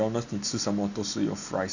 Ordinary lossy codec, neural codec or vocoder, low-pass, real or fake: none; none; 7.2 kHz; real